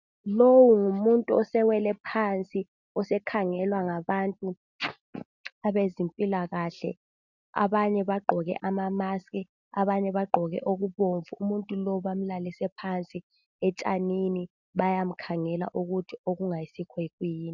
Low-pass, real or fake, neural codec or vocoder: 7.2 kHz; real; none